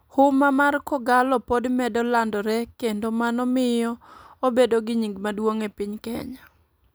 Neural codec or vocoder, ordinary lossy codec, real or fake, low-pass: vocoder, 44.1 kHz, 128 mel bands every 256 samples, BigVGAN v2; none; fake; none